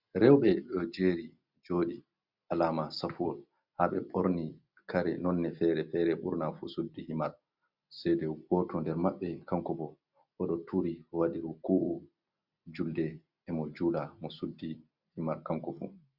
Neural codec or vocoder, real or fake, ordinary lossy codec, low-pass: none; real; Opus, 64 kbps; 5.4 kHz